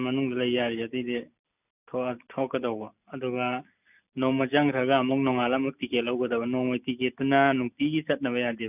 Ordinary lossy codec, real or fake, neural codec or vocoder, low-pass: none; real; none; 3.6 kHz